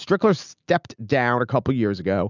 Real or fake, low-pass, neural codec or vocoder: real; 7.2 kHz; none